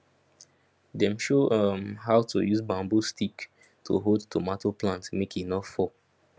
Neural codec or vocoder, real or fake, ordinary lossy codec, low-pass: none; real; none; none